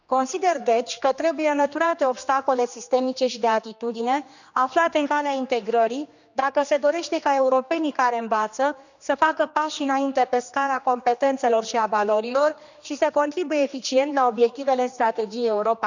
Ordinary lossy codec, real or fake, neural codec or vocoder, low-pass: none; fake; codec, 16 kHz, 2 kbps, X-Codec, HuBERT features, trained on general audio; 7.2 kHz